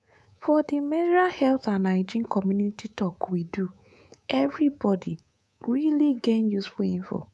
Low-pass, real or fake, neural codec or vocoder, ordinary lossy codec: none; fake; codec, 24 kHz, 3.1 kbps, DualCodec; none